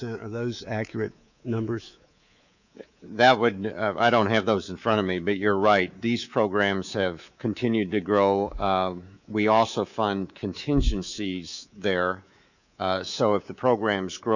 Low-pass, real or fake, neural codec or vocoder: 7.2 kHz; fake; codec, 24 kHz, 3.1 kbps, DualCodec